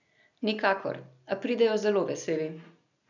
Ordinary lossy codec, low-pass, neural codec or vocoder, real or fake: none; 7.2 kHz; none; real